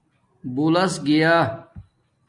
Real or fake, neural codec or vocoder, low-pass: real; none; 10.8 kHz